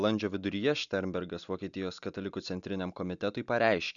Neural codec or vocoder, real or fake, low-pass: none; real; 7.2 kHz